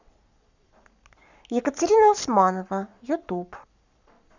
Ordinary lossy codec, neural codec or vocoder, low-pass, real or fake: none; codec, 44.1 kHz, 7.8 kbps, Pupu-Codec; 7.2 kHz; fake